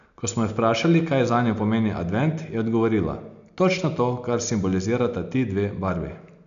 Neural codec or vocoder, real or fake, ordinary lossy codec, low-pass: none; real; MP3, 96 kbps; 7.2 kHz